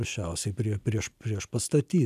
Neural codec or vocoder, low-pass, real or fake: none; 14.4 kHz; real